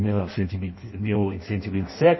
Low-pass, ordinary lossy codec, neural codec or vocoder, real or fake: 7.2 kHz; MP3, 24 kbps; codec, 16 kHz in and 24 kHz out, 0.6 kbps, FireRedTTS-2 codec; fake